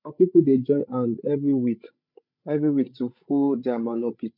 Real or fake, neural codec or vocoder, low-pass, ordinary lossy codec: fake; codec, 16 kHz, 16 kbps, FreqCodec, larger model; 5.4 kHz; none